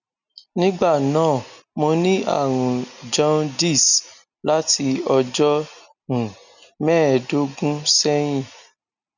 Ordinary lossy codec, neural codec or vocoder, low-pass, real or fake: none; none; 7.2 kHz; real